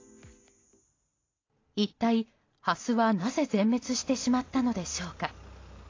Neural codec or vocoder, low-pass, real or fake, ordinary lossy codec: none; 7.2 kHz; real; AAC, 32 kbps